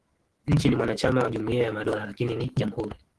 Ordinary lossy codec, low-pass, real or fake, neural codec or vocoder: Opus, 16 kbps; 10.8 kHz; fake; autoencoder, 48 kHz, 128 numbers a frame, DAC-VAE, trained on Japanese speech